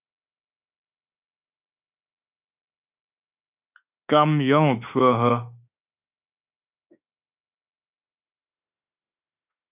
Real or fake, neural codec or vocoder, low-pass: fake; autoencoder, 48 kHz, 32 numbers a frame, DAC-VAE, trained on Japanese speech; 3.6 kHz